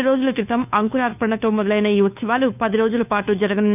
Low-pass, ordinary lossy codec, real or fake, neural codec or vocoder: 3.6 kHz; none; fake; codec, 16 kHz, 2 kbps, FunCodec, trained on Chinese and English, 25 frames a second